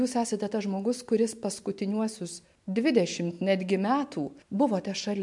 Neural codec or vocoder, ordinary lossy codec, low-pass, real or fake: none; MP3, 64 kbps; 10.8 kHz; real